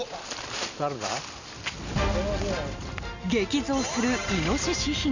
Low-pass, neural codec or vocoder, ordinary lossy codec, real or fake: 7.2 kHz; none; none; real